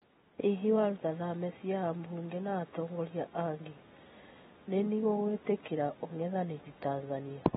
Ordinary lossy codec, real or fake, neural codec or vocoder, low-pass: AAC, 16 kbps; real; none; 19.8 kHz